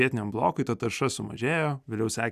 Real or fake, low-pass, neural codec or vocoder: real; 14.4 kHz; none